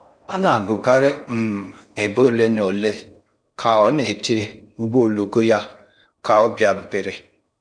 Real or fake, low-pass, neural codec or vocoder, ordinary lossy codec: fake; 9.9 kHz; codec, 16 kHz in and 24 kHz out, 0.6 kbps, FocalCodec, streaming, 4096 codes; none